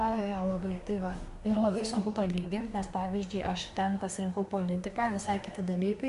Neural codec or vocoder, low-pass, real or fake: codec, 24 kHz, 1 kbps, SNAC; 10.8 kHz; fake